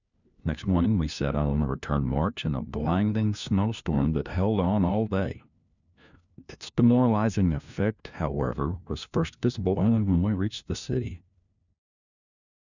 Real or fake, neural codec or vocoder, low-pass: fake; codec, 16 kHz, 1 kbps, FunCodec, trained on LibriTTS, 50 frames a second; 7.2 kHz